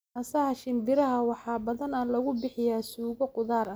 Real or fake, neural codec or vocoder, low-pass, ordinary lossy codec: real; none; none; none